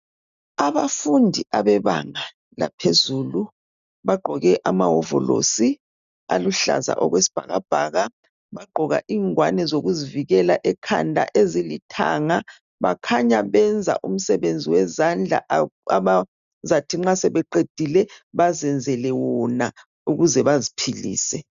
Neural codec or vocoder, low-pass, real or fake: none; 7.2 kHz; real